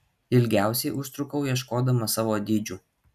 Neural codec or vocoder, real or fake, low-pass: none; real; 14.4 kHz